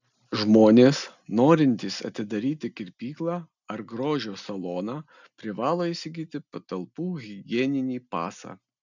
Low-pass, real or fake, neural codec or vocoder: 7.2 kHz; real; none